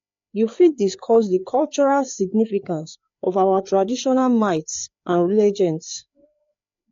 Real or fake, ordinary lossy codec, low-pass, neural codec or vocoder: fake; AAC, 48 kbps; 7.2 kHz; codec, 16 kHz, 4 kbps, FreqCodec, larger model